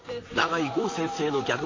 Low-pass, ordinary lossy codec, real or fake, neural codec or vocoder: 7.2 kHz; AAC, 32 kbps; fake; vocoder, 44.1 kHz, 128 mel bands, Pupu-Vocoder